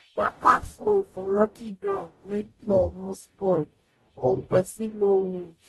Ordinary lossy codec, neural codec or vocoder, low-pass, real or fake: AAC, 32 kbps; codec, 44.1 kHz, 0.9 kbps, DAC; 19.8 kHz; fake